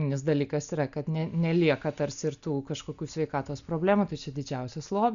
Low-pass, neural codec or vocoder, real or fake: 7.2 kHz; none; real